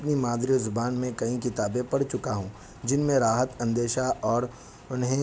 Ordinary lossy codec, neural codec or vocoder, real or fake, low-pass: none; none; real; none